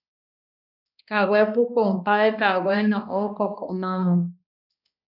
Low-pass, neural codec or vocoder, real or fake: 5.4 kHz; codec, 16 kHz, 1 kbps, X-Codec, HuBERT features, trained on balanced general audio; fake